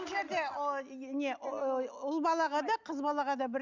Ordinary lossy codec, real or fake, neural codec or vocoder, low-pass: Opus, 64 kbps; real; none; 7.2 kHz